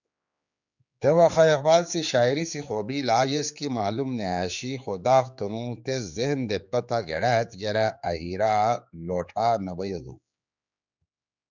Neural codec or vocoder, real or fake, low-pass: codec, 16 kHz, 4 kbps, X-Codec, HuBERT features, trained on general audio; fake; 7.2 kHz